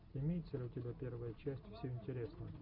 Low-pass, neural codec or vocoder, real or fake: 5.4 kHz; none; real